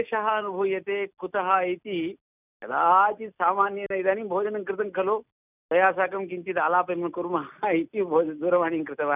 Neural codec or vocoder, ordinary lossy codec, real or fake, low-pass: none; none; real; 3.6 kHz